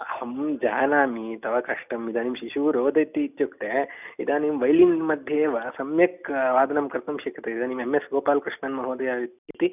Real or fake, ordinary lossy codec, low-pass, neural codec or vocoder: real; none; 3.6 kHz; none